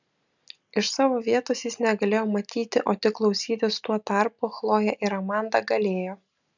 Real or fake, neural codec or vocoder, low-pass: real; none; 7.2 kHz